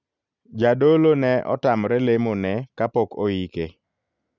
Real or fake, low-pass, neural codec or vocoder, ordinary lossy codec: real; 7.2 kHz; none; none